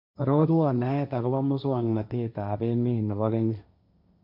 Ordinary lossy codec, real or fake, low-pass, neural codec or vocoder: none; fake; 5.4 kHz; codec, 16 kHz, 1.1 kbps, Voila-Tokenizer